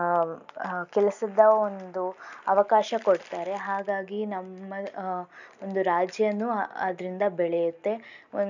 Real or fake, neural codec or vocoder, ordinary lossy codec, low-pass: real; none; none; 7.2 kHz